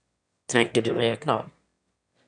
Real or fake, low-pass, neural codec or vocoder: fake; 9.9 kHz; autoencoder, 22.05 kHz, a latent of 192 numbers a frame, VITS, trained on one speaker